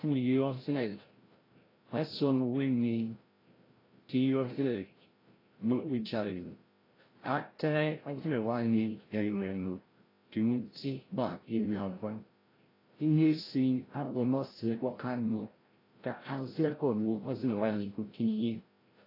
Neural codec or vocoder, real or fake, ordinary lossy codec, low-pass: codec, 16 kHz, 0.5 kbps, FreqCodec, larger model; fake; AAC, 24 kbps; 5.4 kHz